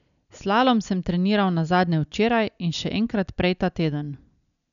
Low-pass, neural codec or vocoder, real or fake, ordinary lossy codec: 7.2 kHz; none; real; none